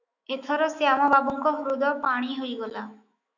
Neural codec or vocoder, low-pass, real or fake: autoencoder, 48 kHz, 128 numbers a frame, DAC-VAE, trained on Japanese speech; 7.2 kHz; fake